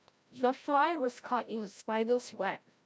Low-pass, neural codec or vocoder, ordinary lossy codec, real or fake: none; codec, 16 kHz, 0.5 kbps, FreqCodec, larger model; none; fake